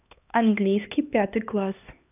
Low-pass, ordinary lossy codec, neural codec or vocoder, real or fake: 3.6 kHz; none; codec, 16 kHz, 2 kbps, X-Codec, HuBERT features, trained on LibriSpeech; fake